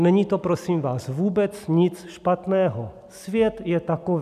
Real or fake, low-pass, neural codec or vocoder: real; 14.4 kHz; none